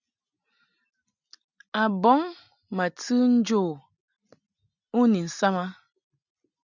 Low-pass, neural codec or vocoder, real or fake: 7.2 kHz; none; real